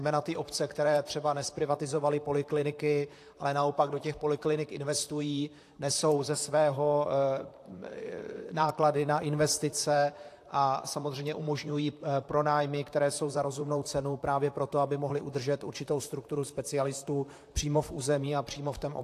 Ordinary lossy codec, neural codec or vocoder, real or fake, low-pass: AAC, 64 kbps; vocoder, 44.1 kHz, 128 mel bands, Pupu-Vocoder; fake; 14.4 kHz